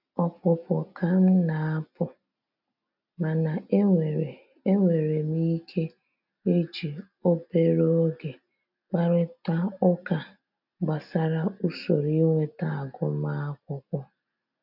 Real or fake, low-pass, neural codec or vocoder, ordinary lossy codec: real; 5.4 kHz; none; none